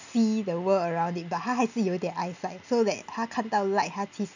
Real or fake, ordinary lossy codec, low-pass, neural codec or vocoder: real; none; 7.2 kHz; none